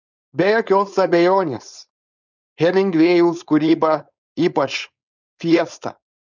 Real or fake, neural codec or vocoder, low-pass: fake; codec, 16 kHz, 4.8 kbps, FACodec; 7.2 kHz